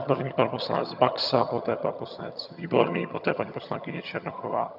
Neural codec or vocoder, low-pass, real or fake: vocoder, 22.05 kHz, 80 mel bands, HiFi-GAN; 5.4 kHz; fake